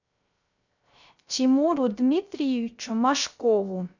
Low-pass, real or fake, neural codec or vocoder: 7.2 kHz; fake; codec, 16 kHz, 0.3 kbps, FocalCodec